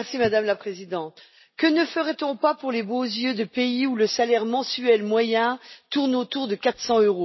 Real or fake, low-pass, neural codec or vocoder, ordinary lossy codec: real; 7.2 kHz; none; MP3, 24 kbps